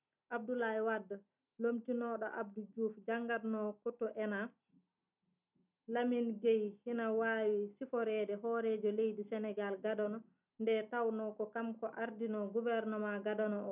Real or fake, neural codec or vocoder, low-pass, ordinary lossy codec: real; none; 3.6 kHz; none